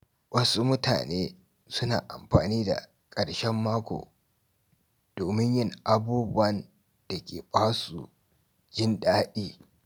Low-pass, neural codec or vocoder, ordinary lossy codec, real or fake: none; none; none; real